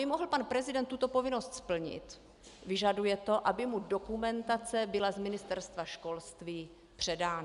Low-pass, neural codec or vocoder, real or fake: 10.8 kHz; none; real